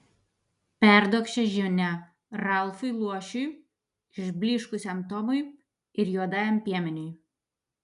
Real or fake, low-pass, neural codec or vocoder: real; 10.8 kHz; none